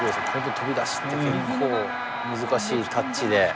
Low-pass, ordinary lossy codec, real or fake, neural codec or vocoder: none; none; real; none